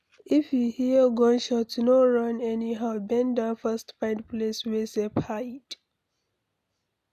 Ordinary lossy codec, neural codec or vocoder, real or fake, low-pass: none; none; real; 14.4 kHz